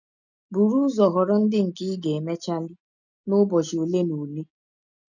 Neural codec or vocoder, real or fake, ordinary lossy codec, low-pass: none; real; none; 7.2 kHz